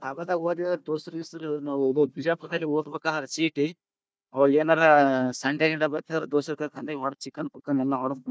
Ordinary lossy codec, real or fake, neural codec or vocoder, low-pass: none; fake; codec, 16 kHz, 1 kbps, FunCodec, trained on Chinese and English, 50 frames a second; none